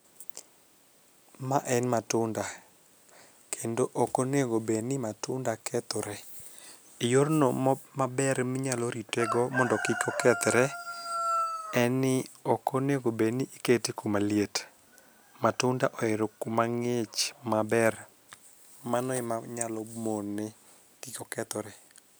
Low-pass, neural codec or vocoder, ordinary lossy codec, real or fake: none; none; none; real